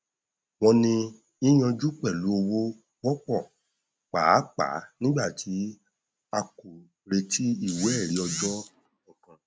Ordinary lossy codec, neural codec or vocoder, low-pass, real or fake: none; none; none; real